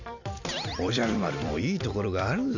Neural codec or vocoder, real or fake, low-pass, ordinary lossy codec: vocoder, 44.1 kHz, 128 mel bands every 256 samples, BigVGAN v2; fake; 7.2 kHz; none